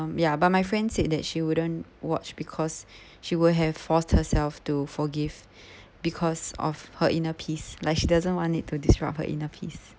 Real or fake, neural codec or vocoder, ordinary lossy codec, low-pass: real; none; none; none